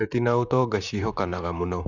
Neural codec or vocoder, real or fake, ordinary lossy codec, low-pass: vocoder, 44.1 kHz, 128 mel bands, Pupu-Vocoder; fake; none; 7.2 kHz